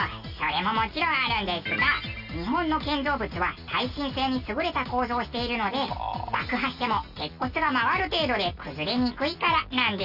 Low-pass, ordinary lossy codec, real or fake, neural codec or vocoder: 5.4 kHz; none; real; none